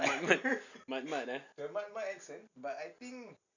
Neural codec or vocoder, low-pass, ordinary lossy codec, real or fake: none; 7.2 kHz; none; real